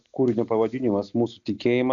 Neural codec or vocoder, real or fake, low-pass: none; real; 7.2 kHz